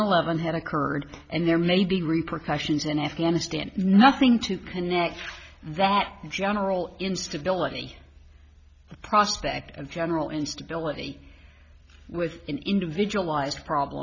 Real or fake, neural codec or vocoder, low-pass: real; none; 7.2 kHz